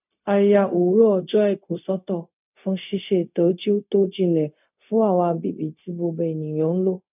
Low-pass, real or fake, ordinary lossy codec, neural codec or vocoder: 3.6 kHz; fake; none; codec, 16 kHz, 0.4 kbps, LongCat-Audio-Codec